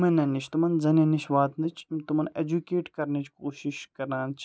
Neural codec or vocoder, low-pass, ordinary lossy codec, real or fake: none; none; none; real